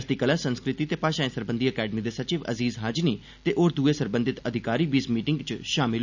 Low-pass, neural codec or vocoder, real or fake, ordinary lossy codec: 7.2 kHz; none; real; none